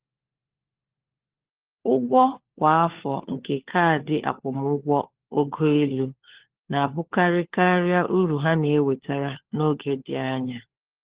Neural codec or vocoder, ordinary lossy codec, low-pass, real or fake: codec, 16 kHz, 4 kbps, FunCodec, trained on LibriTTS, 50 frames a second; Opus, 16 kbps; 3.6 kHz; fake